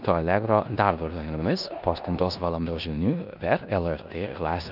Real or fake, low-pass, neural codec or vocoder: fake; 5.4 kHz; codec, 16 kHz in and 24 kHz out, 0.9 kbps, LongCat-Audio-Codec, four codebook decoder